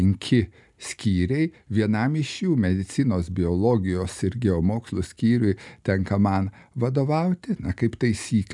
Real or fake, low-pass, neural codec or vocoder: real; 10.8 kHz; none